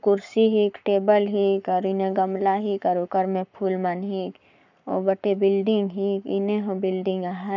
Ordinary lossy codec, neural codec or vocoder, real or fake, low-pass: none; codec, 44.1 kHz, 7.8 kbps, Pupu-Codec; fake; 7.2 kHz